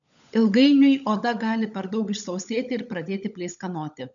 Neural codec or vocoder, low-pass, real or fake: codec, 16 kHz, 16 kbps, FunCodec, trained on LibriTTS, 50 frames a second; 7.2 kHz; fake